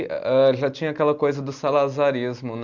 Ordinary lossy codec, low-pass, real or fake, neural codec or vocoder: Opus, 64 kbps; 7.2 kHz; real; none